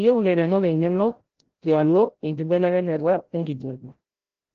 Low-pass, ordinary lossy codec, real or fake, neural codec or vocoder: 7.2 kHz; Opus, 16 kbps; fake; codec, 16 kHz, 0.5 kbps, FreqCodec, larger model